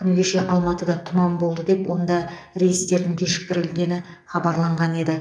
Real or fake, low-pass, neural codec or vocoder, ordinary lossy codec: fake; 9.9 kHz; codec, 44.1 kHz, 2.6 kbps, SNAC; none